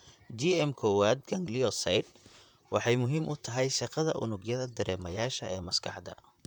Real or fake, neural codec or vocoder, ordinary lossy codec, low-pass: fake; vocoder, 44.1 kHz, 128 mel bands, Pupu-Vocoder; none; 19.8 kHz